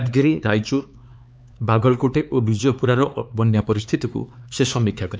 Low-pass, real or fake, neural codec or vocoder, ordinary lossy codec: none; fake; codec, 16 kHz, 4 kbps, X-Codec, HuBERT features, trained on LibriSpeech; none